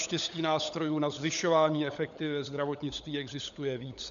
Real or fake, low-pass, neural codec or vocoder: fake; 7.2 kHz; codec, 16 kHz, 16 kbps, FunCodec, trained on LibriTTS, 50 frames a second